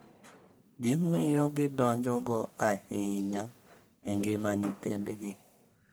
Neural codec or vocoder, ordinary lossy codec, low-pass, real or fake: codec, 44.1 kHz, 1.7 kbps, Pupu-Codec; none; none; fake